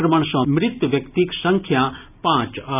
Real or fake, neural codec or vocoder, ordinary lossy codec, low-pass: real; none; none; 3.6 kHz